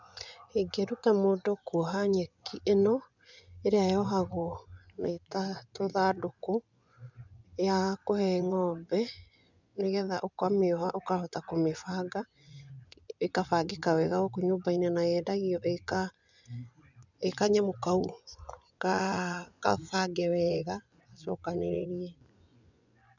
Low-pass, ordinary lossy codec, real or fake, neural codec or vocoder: 7.2 kHz; none; fake; vocoder, 24 kHz, 100 mel bands, Vocos